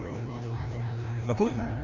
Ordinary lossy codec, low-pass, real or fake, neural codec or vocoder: Opus, 64 kbps; 7.2 kHz; fake; codec, 16 kHz, 1 kbps, FreqCodec, larger model